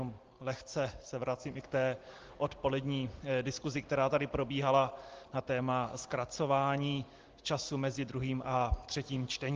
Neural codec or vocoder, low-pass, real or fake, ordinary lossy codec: none; 7.2 kHz; real; Opus, 16 kbps